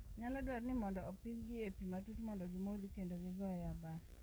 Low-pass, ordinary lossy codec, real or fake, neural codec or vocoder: none; none; fake; codec, 44.1 kHz, 7.8 kbps, DAC